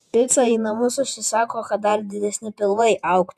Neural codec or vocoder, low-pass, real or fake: vocoder, 48 kHz, 128 mel bands, Vocos; 14.4 kHz; fake